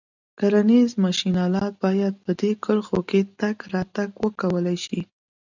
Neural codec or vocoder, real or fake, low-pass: none; real; 7.2 kHz